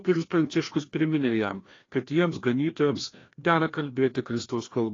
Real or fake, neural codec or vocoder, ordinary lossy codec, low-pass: fake; codec, 16 kHz, 1 kbps, FreqCodec, larger model; AAC, 32 kbps; 7.2 kHz